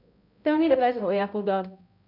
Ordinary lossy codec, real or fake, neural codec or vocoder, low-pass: none; fake; codec, 16 kHz, 0.5 kbps, X-Codec, HuBERT features, trained on balanced general audio; 5.4 kHz